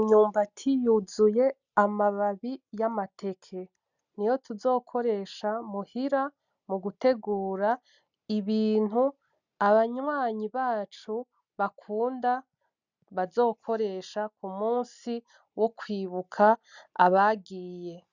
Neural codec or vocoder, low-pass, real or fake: none; 7.2 kHz; real